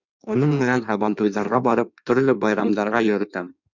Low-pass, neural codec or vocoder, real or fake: 7.2 kHz; codec, 16 kHz in and 24 kHz out, 1.1 kbps, FireRedTTS-2 codec; fake